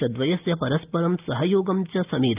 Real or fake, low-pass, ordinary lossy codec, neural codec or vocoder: real; 3.6 kHz; Opus, 24 kbps; none